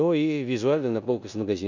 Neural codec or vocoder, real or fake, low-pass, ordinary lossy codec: codec, 16 kHz in and 24 kHz out, 0.9 kbps, LongCat-Audio-Codec, four codebook decoder; fake; 7.2 kHz; none